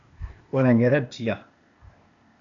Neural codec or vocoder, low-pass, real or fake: codec, 16 kHz, 0.8 kbps, ZipCodec; 7.2 kHz; fake